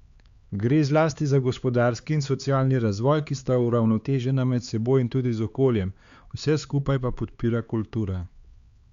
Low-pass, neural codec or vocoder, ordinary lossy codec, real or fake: 7.2 kHz; codec, 16 kHz, 4 kbps, X-Codec, HuBERT features, trained on LibriSpeech; Opus, 64 kbps; fake